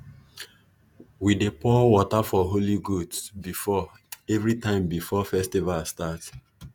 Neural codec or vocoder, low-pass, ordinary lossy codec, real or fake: vocoder, 48 kHz, 128 mel bands, Vocos; none; none; fake